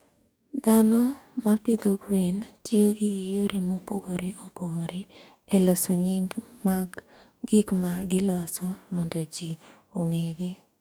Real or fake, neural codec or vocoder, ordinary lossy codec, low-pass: fake; codec, 44.1 kHz, 2.6 kbps, DAC; none; none